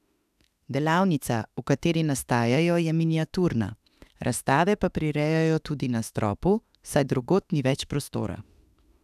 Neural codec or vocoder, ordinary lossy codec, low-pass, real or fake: autoencoder, 48 kHz, 32 numbers a frame, DAC-VAE, trained on Japanese speech; none; 14.4 kHz; fake